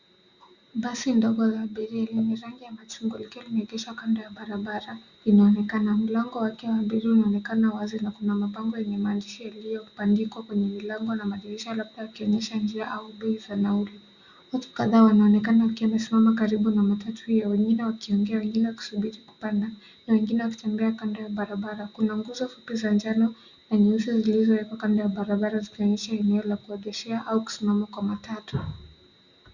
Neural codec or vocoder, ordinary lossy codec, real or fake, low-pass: none; Opus, 64 kbps; real; 7.2 kHz